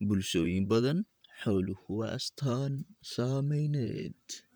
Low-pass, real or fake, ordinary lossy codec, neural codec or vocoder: none; fake; none; vocoder, 44.1 kHz, 128 mel bands, Pupu-Vocoder